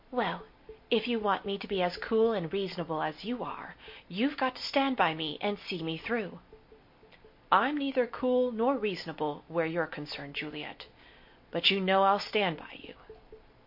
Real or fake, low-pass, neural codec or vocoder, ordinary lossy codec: real; 5.4 kHz; none; MP3, 32 kbps